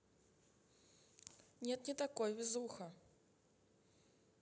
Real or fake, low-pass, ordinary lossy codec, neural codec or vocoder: real; none; none; none